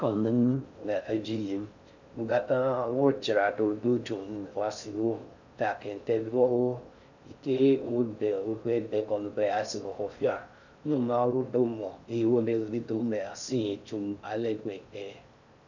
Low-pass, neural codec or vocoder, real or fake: 7.2 kHz; codec, 16 kHz in and 24 kHz out, 0.6 kbps, FocalCodec, streaming, 4096 codes; fake